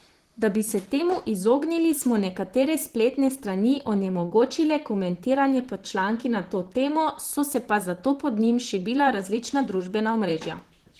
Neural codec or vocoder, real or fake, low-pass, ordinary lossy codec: vocoder, 44.1 kHz, 128 mel bands, Pupu-Vocoder; fake; 14.4 kHz; Opus, 16 kbps